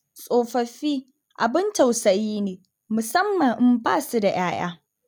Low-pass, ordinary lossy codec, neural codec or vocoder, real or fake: none; none; none; real